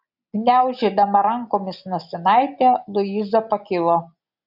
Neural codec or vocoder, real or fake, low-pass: none; real; 5.4 kHz